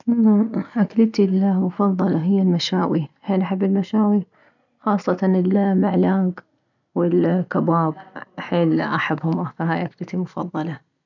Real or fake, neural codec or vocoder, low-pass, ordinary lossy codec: real; none; 7.2 kHz; none